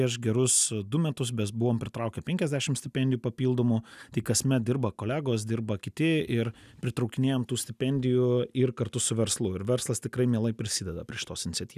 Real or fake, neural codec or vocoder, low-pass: real; none; 14.4 kHz